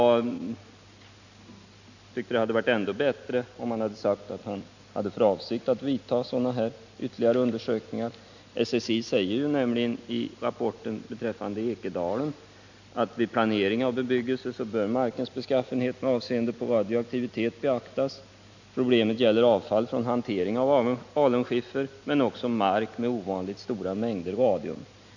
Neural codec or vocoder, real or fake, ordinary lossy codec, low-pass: none; real; none; 7.2 kHz